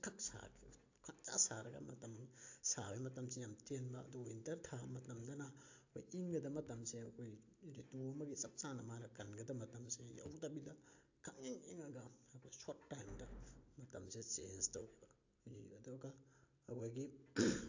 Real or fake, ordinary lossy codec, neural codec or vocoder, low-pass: real; AAC, 48 kbps; none; 7.2 kHz